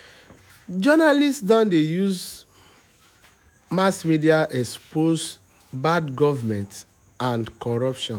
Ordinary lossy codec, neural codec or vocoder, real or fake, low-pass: none; autoencoder, 48 kHz, 128 numbers a frame, DAC-VAE, trained on Japanese speech; fake; 19.8 kHz